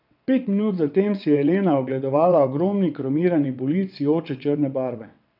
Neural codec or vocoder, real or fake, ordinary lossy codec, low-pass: vocoder, 22.05 kHz, 80 mel bands, WaveNeXt; fake; none; 5.4 kHz